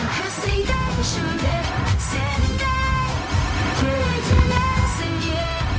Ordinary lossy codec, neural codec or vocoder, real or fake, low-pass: none; codec, 16 kHz, 0.4 kbps, LongCat-Audio-Codec; fake; none